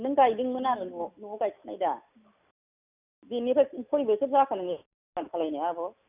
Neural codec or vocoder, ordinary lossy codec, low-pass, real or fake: none; none; 3.6 kHz; real